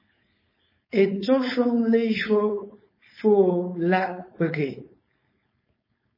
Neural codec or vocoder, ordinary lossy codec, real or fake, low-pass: codec, 16 kHz, 4.8 kbps, FACodec; MP3, 24 kbps; fake; 5.4 kHz